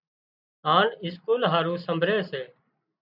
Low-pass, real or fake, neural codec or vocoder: 5.4 kHz; real; none